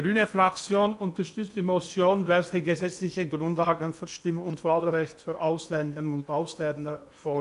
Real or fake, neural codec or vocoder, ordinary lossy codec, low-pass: fake; codec, 16 kHz in and 24 kHz out, 0.8 kbps, FocalCodec, streaming, 65536 codes; none; 10.8 kHz